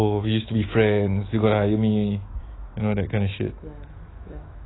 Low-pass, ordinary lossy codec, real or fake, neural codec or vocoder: 7.2 kHz; AAC, 16 kbps; fake; autoencoder, 48 kHz, 128 numbers a frame, DAC-VAE, trained on Japanese speech